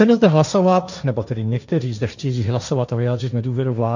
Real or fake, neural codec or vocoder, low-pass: fake; codec, 16 kHz, 1.1 kbps, Voila-Tokenizer; 7.2 kHz